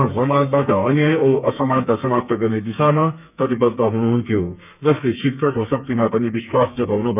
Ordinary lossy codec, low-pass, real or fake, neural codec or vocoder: none; 3.6 kHz; fake; codec, 32 kHz, 1.9 kbps, SNAC